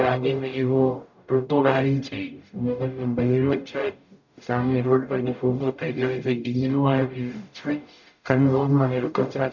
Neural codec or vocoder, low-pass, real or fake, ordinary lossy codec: codec, 44.1 kHz, 0.9 kbps, DAC; 7.2 kHz; fake; none